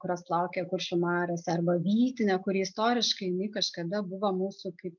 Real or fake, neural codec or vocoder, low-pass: real; none; 7.2 kHz